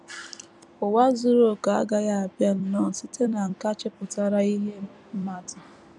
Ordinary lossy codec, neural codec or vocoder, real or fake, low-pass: none; vocoder, 44.1 kHz, 128 mel bands every 256 samples, BigVGAN v2; fake; 10.8 kHz